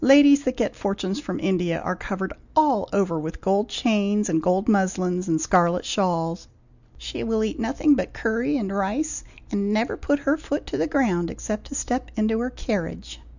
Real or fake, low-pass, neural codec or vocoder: real; 7.2 kHz; none